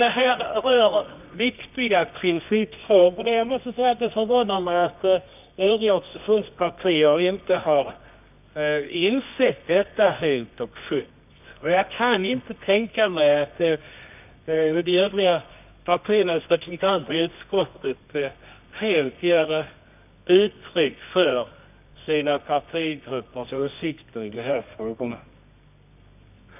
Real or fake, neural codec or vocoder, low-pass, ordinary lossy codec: fake; codec, 24 kHz, 0.9 kbps, WavTokenizer, medium music audio release; 3.6 kHz; none